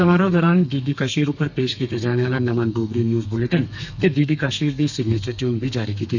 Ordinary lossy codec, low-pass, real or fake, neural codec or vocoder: none; 7.2 kHz; fake; codec, 32 kHz, 1.9 kbps, SNAC